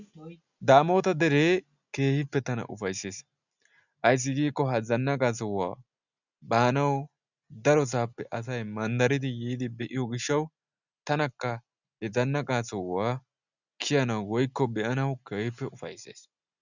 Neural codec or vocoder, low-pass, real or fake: none; 7.2 kHz; real